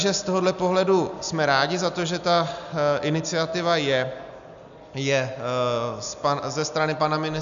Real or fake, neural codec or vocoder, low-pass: real; none; 7.2 kHz